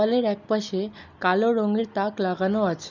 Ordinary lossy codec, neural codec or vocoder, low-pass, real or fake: none; none; 7.2 kHz; real